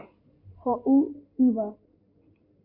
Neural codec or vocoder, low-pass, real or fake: codec, 16 kHz in and 24 kHz out, 2.2 kbps, FireRedTTS-2 codec; 5.4 kHz; fake